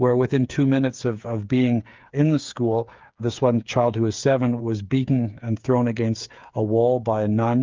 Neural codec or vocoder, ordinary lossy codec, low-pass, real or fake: codec, 16 kHz, 8 kbps, FreqCodec, smaller model; Opus, 32 kbps; 7.2 kHz; fake